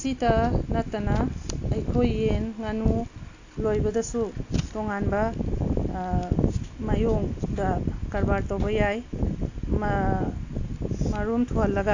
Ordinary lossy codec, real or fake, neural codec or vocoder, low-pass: AAC, 48 kbps; real; none; 7.2 kHz